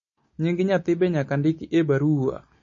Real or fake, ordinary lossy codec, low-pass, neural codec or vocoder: real; MP3, 32 kbps; 7.2 kHz; none